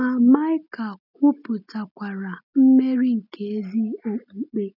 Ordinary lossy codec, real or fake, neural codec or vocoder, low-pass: none; real; none; 5.4 kHz